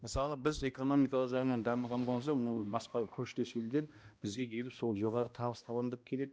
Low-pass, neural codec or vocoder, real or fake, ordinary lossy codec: none; codec, 16 kHz, 1 kbps, X-Codec, HuBERT features, trained on balanced general audio; fake; none